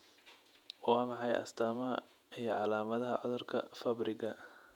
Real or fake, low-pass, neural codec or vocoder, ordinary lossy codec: fake; 19.8 kHz; vocoder, 48 kHz, 128 mel bands, Vocos; MP3, 96 kbps